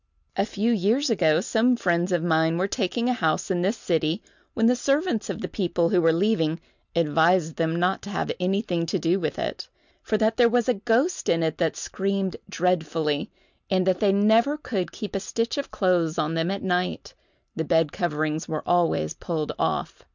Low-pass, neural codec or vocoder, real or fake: 7.2 kHz; none; real